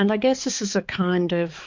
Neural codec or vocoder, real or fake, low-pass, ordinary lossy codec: codec, 16 kHz, 4 kbps, X-Codec, HuBERT features, trained on balanced general audio; fake; 7.2 kHz; MP3, 48 kbps